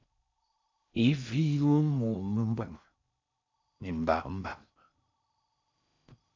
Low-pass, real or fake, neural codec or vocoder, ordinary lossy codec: 7.2 kHz; fake; codec, 16 kHz in and 24 kHz out, 0.6 kbps, FocalCodec, streaming, 4096 codes; MP3, 64 kbps